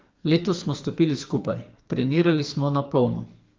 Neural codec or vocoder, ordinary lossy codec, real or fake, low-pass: codec, 44.1 kHz, 2.6 kbps, SNAC; Opus, 32 kbps; fake; 7.2 kHz